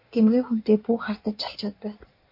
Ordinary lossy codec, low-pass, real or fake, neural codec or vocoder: MP3, 32 kbps; 5.4 kHz; fake; codec, 16 kHz in and 24 kHz out, 1.1 kbps, FireRedTTS-2 codec